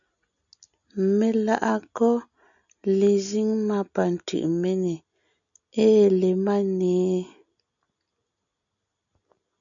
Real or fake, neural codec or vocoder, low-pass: real; none; 7.2 kHz